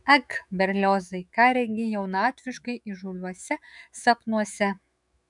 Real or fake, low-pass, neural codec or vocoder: fake; 10.8 kHz; autoencoder, 48 kHz, 128 numbers a frame, DAC-VAE, trained on Japanese speech